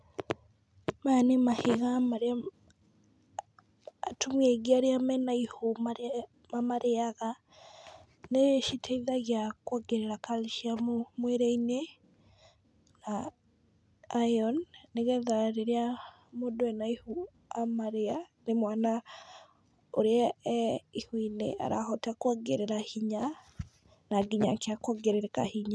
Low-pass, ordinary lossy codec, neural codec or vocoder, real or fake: none; none; none; real